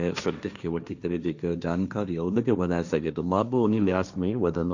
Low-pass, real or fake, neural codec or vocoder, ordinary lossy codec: 7.2 kHz; fake; codec, 16 kHz, 1.1 kbps, Voila-Tokenizer; none